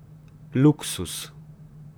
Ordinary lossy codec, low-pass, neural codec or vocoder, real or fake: none; none; vocoder, 44.1 kHz, 128 mel bands, Pupu-Vocoder; fake